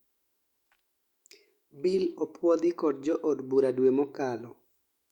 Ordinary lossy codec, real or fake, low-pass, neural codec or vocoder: Opus, 64 kbps; fake; 19.8 kHz; autoencoder, 48 kHz, 128 numbers a frame, DAC-VAE, trained on Japanese speech